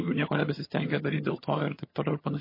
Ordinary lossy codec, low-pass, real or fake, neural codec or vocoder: MP3, 24 kbps; 5.4 kHz; fake; vocoder, 22.05 kHz, 80 mel bands, HiFi-GAN